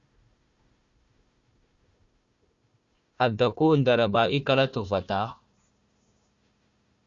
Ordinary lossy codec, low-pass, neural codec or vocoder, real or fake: Opus, 64 kbps; 7.2 kHz; codec, 16 kHz, 1 kbps, FunCodec, trained on Chinese and English, 50 frames a second; fake